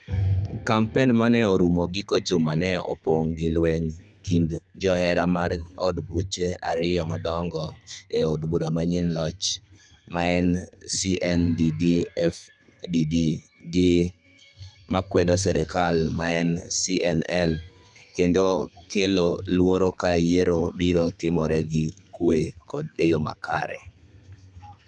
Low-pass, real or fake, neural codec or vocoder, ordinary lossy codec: 10.8 kHz; fake; codec, 32 kHz, 1.9 kbps, SNAC; none